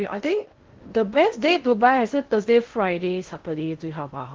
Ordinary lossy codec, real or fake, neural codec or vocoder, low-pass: Opus, 16 kbps; fake; codec, 16 kHz in and 24 kHz out, 0.6 kbps, FocalCodec, streaming, 2048 codes; 7.2 kHz